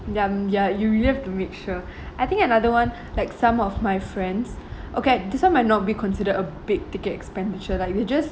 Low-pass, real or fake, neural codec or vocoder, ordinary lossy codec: none; real; none; none